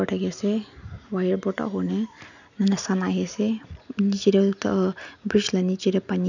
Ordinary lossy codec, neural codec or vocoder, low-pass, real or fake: none; none; 7.2 kHz; real